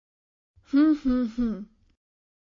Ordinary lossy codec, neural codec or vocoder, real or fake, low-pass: MP3, 32 kbps; none; real; 7.2 kHz